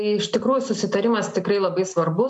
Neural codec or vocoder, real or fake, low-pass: none; real; 10.8 kHz